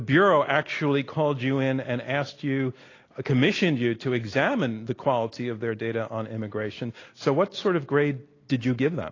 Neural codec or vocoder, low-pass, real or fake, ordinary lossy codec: none; 7.2 kHz; real; AAC, 32 kbps